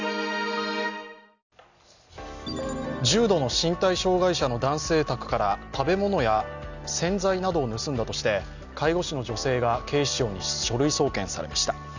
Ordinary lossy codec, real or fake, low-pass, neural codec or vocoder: none; real; 7.2 kHz; none